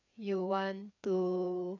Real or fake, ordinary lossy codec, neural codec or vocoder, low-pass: fake; none; codec, 16 kHz, 4 kbps, FreqCodec, larger model; 7.2 kHz